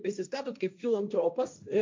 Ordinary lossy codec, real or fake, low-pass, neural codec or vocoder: MP3, 64 kbps; fake; 7.2 kHz; codec, 16 kHz, 1.1 kbps, Voila-Tokenizer